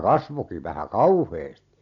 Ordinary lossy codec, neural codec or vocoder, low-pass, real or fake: none; none; 7.2 kHz; real